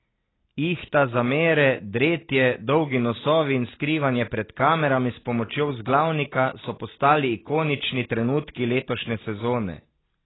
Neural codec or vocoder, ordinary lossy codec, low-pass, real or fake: none; AAC, 16 kbps; 7.2 kHz; real